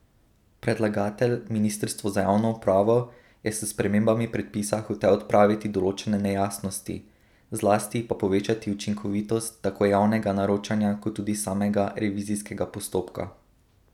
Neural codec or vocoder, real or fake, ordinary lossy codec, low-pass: none; real; none; 19.8 kHz